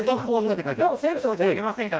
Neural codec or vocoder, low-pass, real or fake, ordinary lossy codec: codec, 16 kHz, 1 kbps, FreqCodec, smaller model; none; fake; none